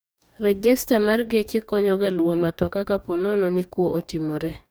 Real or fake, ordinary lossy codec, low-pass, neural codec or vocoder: fake; none; none; codec, 44.1 kHz, 2.6 kbps, DAC